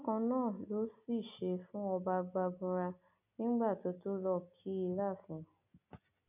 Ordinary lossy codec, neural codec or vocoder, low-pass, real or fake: none; none; 3.6 kHz; real